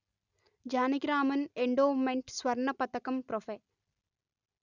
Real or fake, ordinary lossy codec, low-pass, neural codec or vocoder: real; none; 7.2 kHz; none